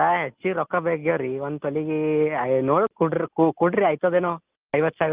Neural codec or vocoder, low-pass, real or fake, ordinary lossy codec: none; 3.6 kHz; real; Opus, 24 kbps